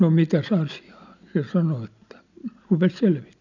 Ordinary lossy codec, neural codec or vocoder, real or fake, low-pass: none; none; real; 7.2 kHz